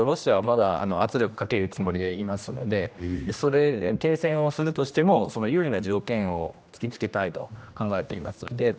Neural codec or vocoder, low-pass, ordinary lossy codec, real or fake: codec, 16 kHz, 1 kbps, X-Codec, HuBERT features, trained on general audio; none; none; fake